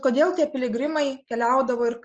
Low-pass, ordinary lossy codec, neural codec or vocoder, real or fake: 7.2 kHz; Opus, 24 kbps; none; real